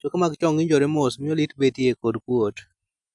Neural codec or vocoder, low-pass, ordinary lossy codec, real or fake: none; 10.8 kHz; none; real